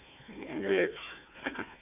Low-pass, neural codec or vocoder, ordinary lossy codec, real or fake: 3.6 kHz; codec, 16 kHz, 1 kbps, FunCodec, trained on Chinese and English, 50 frames a second; AAC, 32 kbps; fake